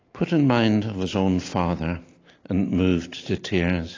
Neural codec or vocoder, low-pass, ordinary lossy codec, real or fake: none; 7.2 kHz; AAC, 32 kbps; real